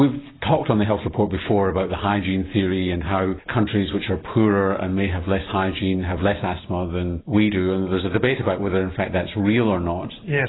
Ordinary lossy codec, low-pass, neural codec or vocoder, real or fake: AAC, 16 kbps; 7.2 kHz; none; real